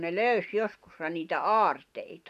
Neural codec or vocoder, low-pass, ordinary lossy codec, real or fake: none; 14.4 kHz; none; real